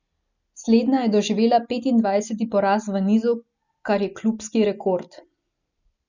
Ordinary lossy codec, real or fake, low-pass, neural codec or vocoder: none; real; 7.2 kHz; none